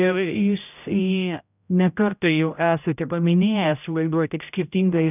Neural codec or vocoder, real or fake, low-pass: codec, 16 kHz, 0.5 kbps, X-Codec, HuBERT features, trained on general audio; fake; 3.6 kHz